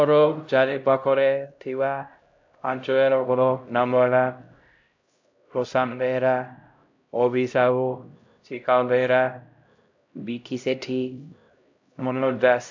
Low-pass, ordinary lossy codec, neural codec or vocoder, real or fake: 7.2 kHz; AAC, 48 kbps; codec, 16 kHz, 0.5 kbps, X-Codec, HuBERT features, trained on LibriSpeech; fake